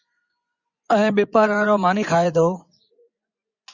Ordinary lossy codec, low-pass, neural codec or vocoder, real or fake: Opus, 64 kbps; 7.2 kHz; vocoder, 24 kHz, 100 mel bands, Vocos; fake